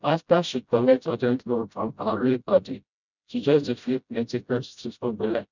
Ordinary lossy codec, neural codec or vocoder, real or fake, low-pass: none; codec, 16 kHz, 0.5 kbps, FreqCodec, smaller model; fake; 7.2 kHz